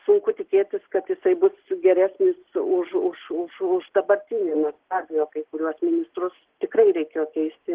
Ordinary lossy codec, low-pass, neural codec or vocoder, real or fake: Opus, 16 kbps; 3.6 kHz; none; real